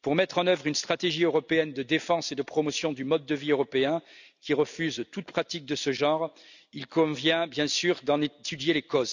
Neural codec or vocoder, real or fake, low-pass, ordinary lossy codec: none; real; 7.2 kHz; none